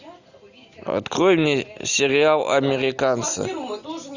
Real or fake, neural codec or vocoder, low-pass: real; none; 7.2 kHz